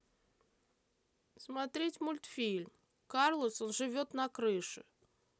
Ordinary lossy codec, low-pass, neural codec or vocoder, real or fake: none; none; none; real